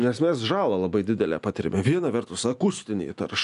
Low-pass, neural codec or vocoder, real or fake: 10.8 kHz; none; real